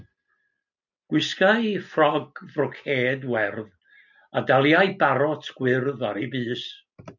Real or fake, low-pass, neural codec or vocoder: real; 7.2 kHz; none